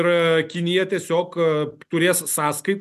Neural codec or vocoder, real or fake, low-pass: none; real; 14.4 kHz